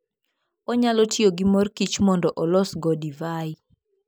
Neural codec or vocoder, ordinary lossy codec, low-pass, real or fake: none; none; none; real